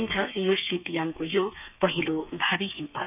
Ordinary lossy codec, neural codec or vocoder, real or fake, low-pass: none; codec, 32 kHz, 1.9 kbps, SNAC; fake; 3.6 kHz